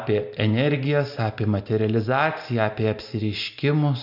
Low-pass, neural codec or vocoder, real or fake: 5.4 kHz; none; real